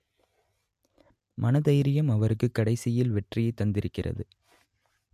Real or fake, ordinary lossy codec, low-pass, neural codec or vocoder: real; MP3, 96 kbps; 14.4 kHz; none